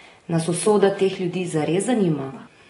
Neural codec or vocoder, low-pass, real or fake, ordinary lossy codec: none; 10.8 kHz; real; AAC, 32 kbps